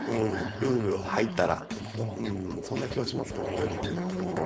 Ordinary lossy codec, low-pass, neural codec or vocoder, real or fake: none; none; codec, 16 kHz, 4.8 kbps, FACodec; fake